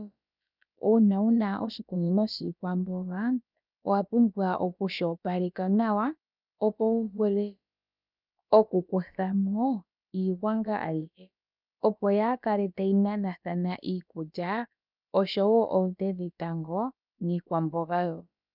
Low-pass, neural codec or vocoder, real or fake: 5.4 kHz; codec, 16 kHz, about 1 kbps, DyCAST, with the encoder's durations; fake